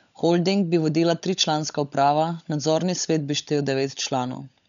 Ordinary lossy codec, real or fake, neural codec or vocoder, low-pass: none; fake; codec, 16 kHz, 16 kbps, FunCodec, trained on LibriTTS, 50 frames a second; 7.2 kHz